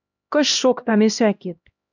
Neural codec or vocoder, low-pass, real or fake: codec, 16 kHz, 1 kbps, X-Codec, HuBERT features, trained on LibriSpeech; 7.2 kHz; fake